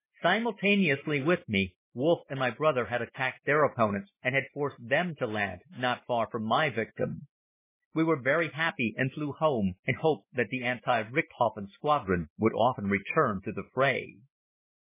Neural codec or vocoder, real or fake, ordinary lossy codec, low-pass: none; real; MP3, 16 kbps; 3.6 kHz